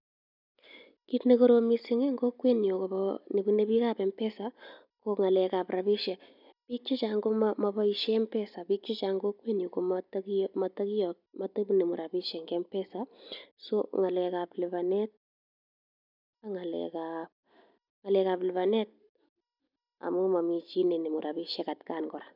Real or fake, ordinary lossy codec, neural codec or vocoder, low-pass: real; none; none; 5.4 kHz